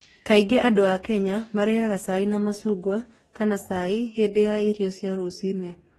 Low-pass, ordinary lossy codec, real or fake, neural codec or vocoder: 19.8 kHz; AAC, 32 kbps; fake; codec, 44.1 kHz, 2.6 kbps, DAC